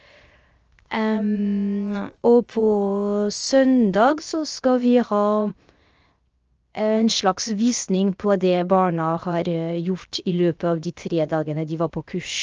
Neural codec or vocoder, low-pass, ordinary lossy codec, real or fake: codec, 16 kHz, 0.7 kbps, FocalCodec; 7.2 kHz; Opus, 24 kbps; fake